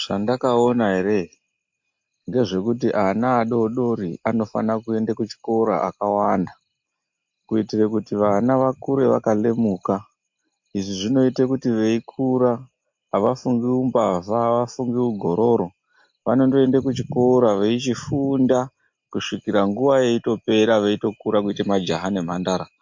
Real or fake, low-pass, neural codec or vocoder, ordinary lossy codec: real; 7.2 kHz; none; MP3, 48 kbps